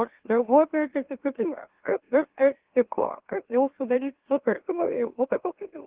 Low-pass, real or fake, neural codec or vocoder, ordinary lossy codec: 3.6 kHz; fake; autoencoder, 44.1 kHz, a latent of 192 numbers a frame, MeloTTS; Opus, 16 kbps